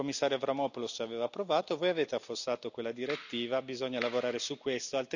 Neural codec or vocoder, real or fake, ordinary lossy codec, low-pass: none; real; none; 7.2 kHz